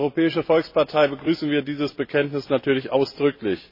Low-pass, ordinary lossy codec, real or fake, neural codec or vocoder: 5.4 kHz; MP3, 24 kbps; real; none